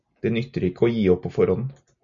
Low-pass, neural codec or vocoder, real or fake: 7.2 kHz; none; real